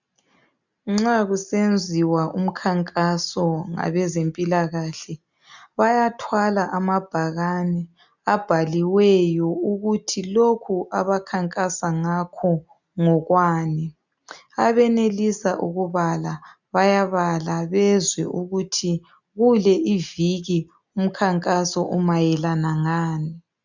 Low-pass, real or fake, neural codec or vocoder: 7.2 kHz; real; none